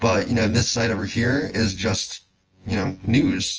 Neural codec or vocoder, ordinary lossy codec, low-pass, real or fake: vocoder, 24 kHz, 100 mel bands, Vocos; Opus, 24 kbps; 7.2 kHz; fake